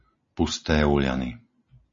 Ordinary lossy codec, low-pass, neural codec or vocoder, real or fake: MP3, 32 kbps; 7.2 kHz; none; real